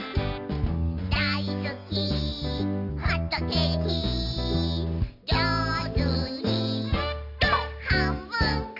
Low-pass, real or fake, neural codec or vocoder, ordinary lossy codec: 5.4 kHz; real; none; none